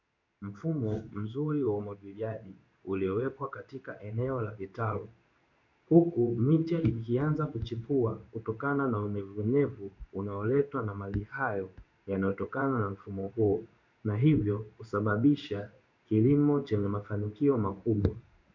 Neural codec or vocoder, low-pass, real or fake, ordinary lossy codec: codec, 16 kHz in and 24 kHz out, 1 kbps, XY-Tokenizer; 7.2 kHz; fake; MP3, 64 kbps